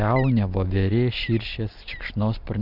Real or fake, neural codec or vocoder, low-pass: real; none; 5.4 kHz